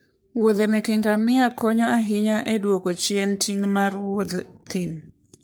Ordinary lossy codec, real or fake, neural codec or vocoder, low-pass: none; fake; codec, 44.1 kHz, 3.4 kbps, Pupu-Codec; none